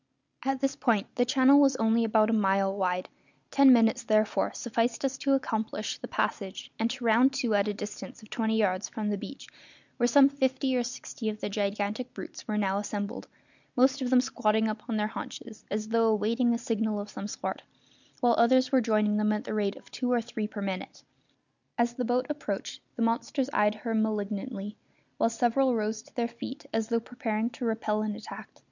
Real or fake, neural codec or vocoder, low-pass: real; none; 7.2 kHz